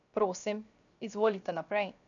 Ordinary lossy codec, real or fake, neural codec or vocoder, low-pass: none; fake; codec, 16 kHz, 0.7 kbps, FocalCodec; 7.2 kHz